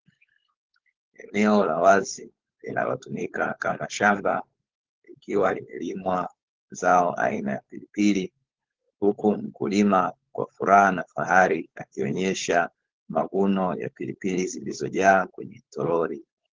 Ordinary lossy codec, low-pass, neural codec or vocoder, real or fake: Opus, 16 kbps; 7.2 kHz; codec, 16 kHz, 4.8 kbps, FACodec; fake